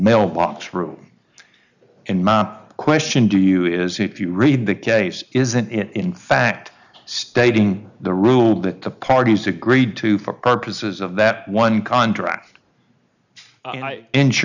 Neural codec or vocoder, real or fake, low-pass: none; real; 7.2 kHz